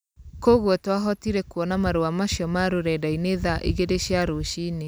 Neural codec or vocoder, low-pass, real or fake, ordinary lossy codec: none; none; real; none